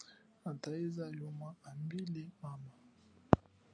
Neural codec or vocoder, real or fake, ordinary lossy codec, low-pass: none; real; AAC, 48 kbps; 9.9 kHz